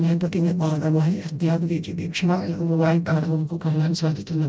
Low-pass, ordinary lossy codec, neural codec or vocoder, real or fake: none; none; codec, 16 kHz, 0.5 kbps, FreqCodec, smaller model; fake